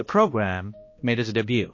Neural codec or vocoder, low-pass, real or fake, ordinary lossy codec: codec, 16 kHz, 1 kbps, X-Codec, HuBERT features, trained on balanced general audio; 7.2 kHz; fake; MP3, 32 kbps